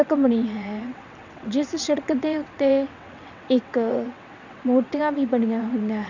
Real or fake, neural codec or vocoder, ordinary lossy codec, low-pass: fake; vocoder, 22.05 kHz, 80 mel bands, WaveNeXt; none; 7.2 kHz